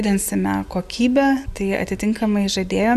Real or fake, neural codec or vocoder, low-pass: real; none; 14.4 kHz